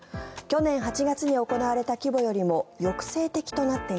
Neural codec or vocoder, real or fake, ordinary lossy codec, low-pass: none; real; none; none